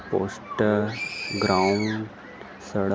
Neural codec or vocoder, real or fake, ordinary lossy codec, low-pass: none; real; none; none